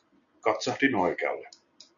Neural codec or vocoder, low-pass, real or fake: none; 7.2 kHz; real